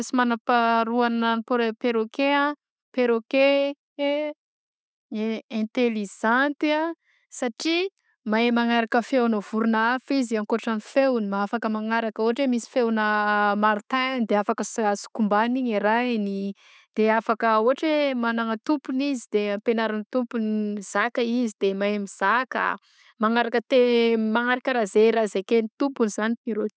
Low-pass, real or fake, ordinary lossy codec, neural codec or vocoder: none; real; none; none